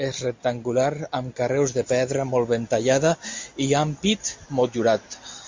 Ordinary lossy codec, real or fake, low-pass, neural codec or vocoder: MP3, 48 kbps; real; 7.2 kHz; none